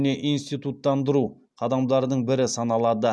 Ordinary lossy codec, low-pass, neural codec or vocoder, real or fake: none; none; none; real